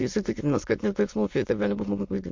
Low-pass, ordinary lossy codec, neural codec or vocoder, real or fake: 7.2 kHz; MP3, 48 kbps; autoencoder, 22.05 kHz, a latent of 192 numbers a frame, VITS, trained on many speakers; fake